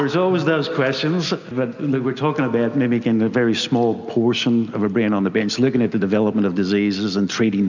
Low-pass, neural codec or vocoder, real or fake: 7.2 kHz; none; real